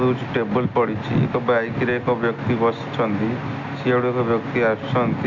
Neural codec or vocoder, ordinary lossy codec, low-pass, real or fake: none; none; 7.2 kHz; real